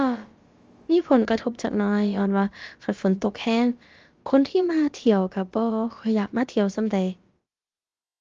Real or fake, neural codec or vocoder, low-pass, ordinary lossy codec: fake; codec, 16 kHz, about 1 kbps, DyCAST, with the encoder's durations; 7.2 kHz; Opus, 32 kbps